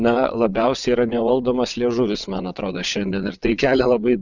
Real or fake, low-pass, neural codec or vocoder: fake; 7.2 kHz; vocoder, 22.05 kHz, 80 mel bands, WaveNeXt